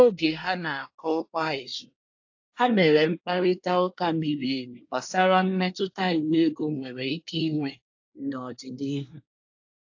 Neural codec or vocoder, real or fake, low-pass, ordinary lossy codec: codec, 24 kHz, 1 kbps, SNAC; fake; 7.2 kHz; AAC, 48 kbps